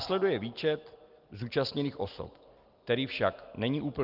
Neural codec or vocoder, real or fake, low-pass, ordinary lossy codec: none; real; 5.4 kHz; Opus, 32 kbps